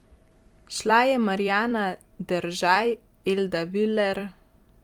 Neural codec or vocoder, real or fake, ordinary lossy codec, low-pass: vocoder, 44.1 kHz, 128 mel bands every 256 samples, BigVGAN v2; fake; Opus, 32 kbps; 19.8 kHz